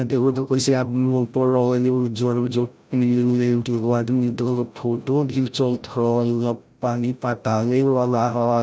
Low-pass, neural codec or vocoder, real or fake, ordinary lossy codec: none; codec, 16 kHz, 0.5 kbps, FreqCodec, larger model; fake; none